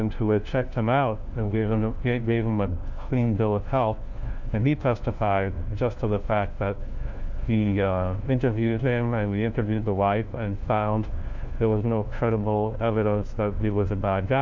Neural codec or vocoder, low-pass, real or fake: codec, 16 kHz, 1 kbps, FunCodec, trained on LibriTTS, 50 frames a second; 7.2 kHz; fake